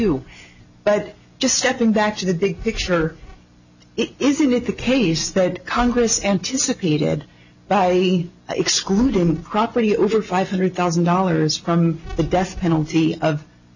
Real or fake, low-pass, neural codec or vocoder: real; 7.2 kHz; none